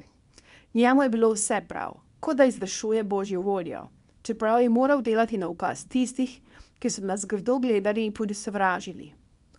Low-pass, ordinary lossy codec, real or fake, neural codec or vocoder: 10.8 kHz; none; fake; codec, 24 kHz, 0.9 kbps, WavTokenizer, small release